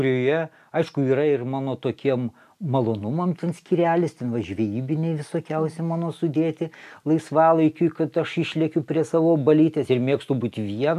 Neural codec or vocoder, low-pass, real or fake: none; 14.4 kHz; real